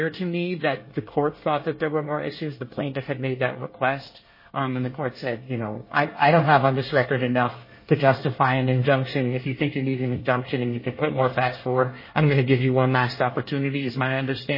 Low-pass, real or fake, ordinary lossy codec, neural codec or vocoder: 5.4 kHz; fake; MP3, 24 kbps; codec, 24 kHz, 1 kbps, SNAC